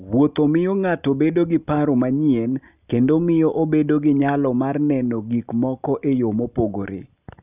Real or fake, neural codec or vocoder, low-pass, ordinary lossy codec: real; none; 3.6 kHz; none